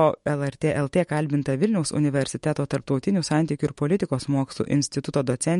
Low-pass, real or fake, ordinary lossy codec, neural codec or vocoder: 19.8 kHz; fake; MP3, 48 kbps; autoencoder, 48 kHz, 128 numbers a frame, DAC-VAE, trained on Japanese speech